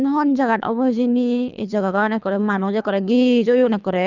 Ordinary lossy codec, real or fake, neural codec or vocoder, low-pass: none; fake; codec, 24 kHz, 3 kbps, HILCodec; 7.2 kHz